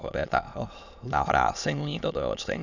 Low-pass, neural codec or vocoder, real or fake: 7.2 kHz; autoencoder, 22.05 kHz, a latent of 192 numbers a frame, VITS, trained on many speakers; fake